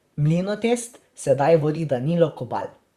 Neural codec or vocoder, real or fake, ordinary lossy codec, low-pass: vocoder, 44.1 kHz, 128 mel bands, Pupu-Vocoder; fake; Opus, 64 kbps; 14.4 kHz